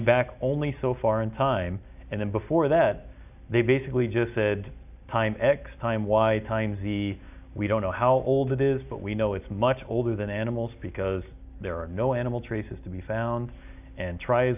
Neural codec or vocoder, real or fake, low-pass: none; real; 3.6 kHz